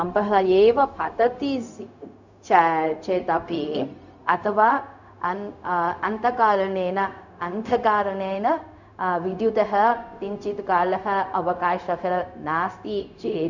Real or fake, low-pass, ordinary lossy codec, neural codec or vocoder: fake; 7.2 kHz; none; codec, 16 kHz, 0.4 kbps, LongCat-Audio-Codec